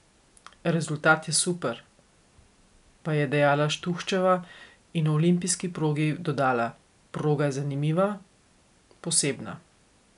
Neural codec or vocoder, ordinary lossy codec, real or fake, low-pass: none; none; real; 10.8 kHz